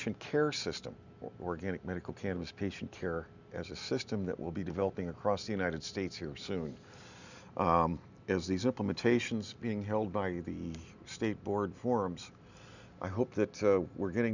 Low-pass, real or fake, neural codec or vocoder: 7.2 kHz; real; none